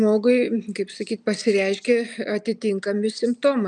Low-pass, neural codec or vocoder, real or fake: 10.8 kHz; none; real